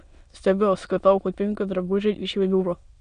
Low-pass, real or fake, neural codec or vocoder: 9.9 kHz; fake; autoencoder, 22.05 kHz, a latent of 192 numbers a frame, VITS, trained on many speakers